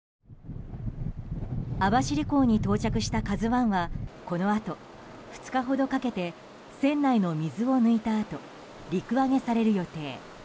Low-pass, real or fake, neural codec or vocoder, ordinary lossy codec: none; real; none; none